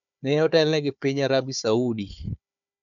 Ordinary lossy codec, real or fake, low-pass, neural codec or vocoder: none; fake; 7.2 kHz; codec, 16 kHz, 4 kbps, FunCodec, trained on Chinese and English, 50 frames a second